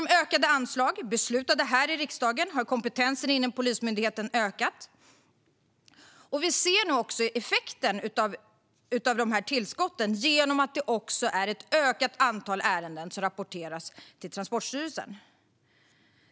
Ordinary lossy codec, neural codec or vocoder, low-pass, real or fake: none; none; none; real